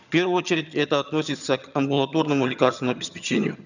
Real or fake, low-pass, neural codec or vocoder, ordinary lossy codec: fake; 7.2 kHz; vocoder, 22.05 kHz, 80 mel bands, HiFi-GAN; none